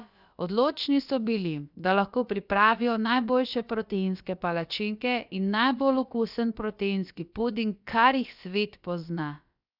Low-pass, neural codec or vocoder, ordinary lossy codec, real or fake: 5.4 kHz; codec, 16 kHz, about 1 kbps, DyCAST, with the encoder's durations; none; fake